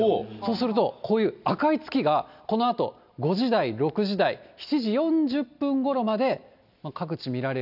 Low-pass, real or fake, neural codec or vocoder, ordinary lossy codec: 5.4 kHz; real; none; none